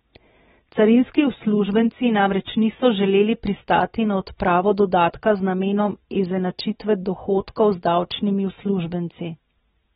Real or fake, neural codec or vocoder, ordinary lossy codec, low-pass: real; none; AAC, 16 kbps; 19.8 kHz